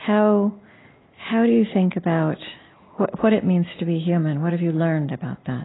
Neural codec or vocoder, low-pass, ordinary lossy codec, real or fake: none; 7.2 kHz; AAC, 16 kbps; real